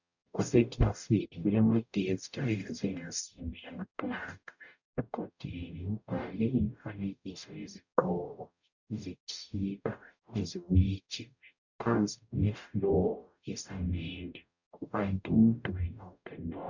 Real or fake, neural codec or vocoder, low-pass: fake; codec, 44.1 kHz, 0.9 kbps, DAC; 7.2 kHz